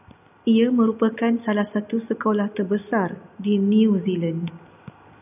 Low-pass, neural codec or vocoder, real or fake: 3.6 kHz; none; real